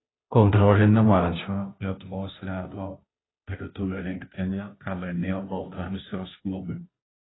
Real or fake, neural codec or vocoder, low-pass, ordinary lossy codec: fake; codec, 16 kHz, 0.5 kbps, FunCodec, trained on Chinese and English, 25 frames a second; 7.2 kHz; AAC, 16 kbps